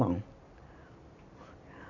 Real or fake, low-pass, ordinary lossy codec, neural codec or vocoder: real; 7.2 kHz; none; none